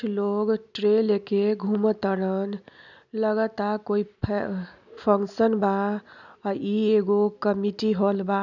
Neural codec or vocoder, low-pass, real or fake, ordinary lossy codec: none; 7.2 kHz; real; none